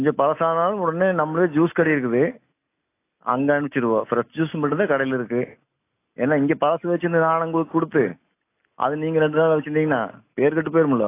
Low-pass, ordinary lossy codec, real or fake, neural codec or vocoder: 3.6 kHz; AAC, 24 kbps; real; none